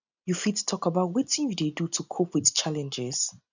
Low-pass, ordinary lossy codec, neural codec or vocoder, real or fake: 7.2 kHz; none; none; real